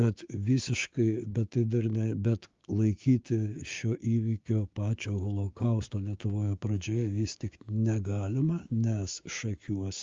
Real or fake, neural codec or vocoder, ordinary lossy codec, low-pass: fake; codec, 16 kHz, 6 kbps, DAC; Opus, 32 kbps; 7.2 kHz